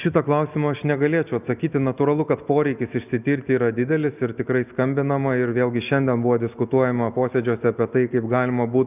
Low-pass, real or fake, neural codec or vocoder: 3.6 kHz; real; none